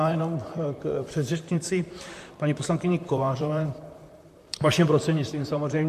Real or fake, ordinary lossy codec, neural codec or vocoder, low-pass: fake; MP3, 64 kbps; vocoder, 44.1 kHz, 128 mel bands, Pupu-Vocoder; 14.4 kHz